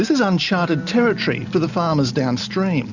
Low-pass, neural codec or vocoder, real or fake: 7.2 kHz; none; real